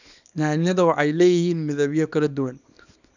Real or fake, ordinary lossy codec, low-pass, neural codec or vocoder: fake; none; 7.2 kHz; codec, 24 kHz, 0.9 kbps, WavTokenizer, small release